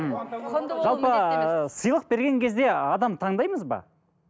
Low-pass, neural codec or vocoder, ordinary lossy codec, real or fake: none; none; none; real